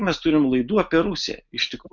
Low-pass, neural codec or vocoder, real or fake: 7.2 kHz; none; real